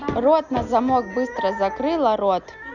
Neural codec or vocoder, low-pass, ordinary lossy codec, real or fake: none; 7.2 kHz; none; real